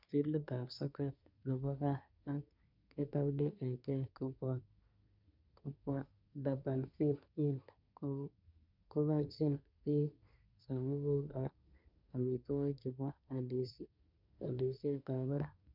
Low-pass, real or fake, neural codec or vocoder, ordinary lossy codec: 5.4 kHz; fake; codec, 24 kHz, 1 kbps, SNAC; none